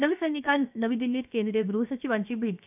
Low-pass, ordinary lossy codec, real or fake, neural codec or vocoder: 3.6 kHz; none; fake; codec, 16 kHz, 0.7 kbps, FocalCodec